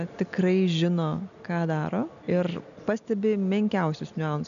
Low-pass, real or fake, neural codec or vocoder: 7.2 kHz; real; none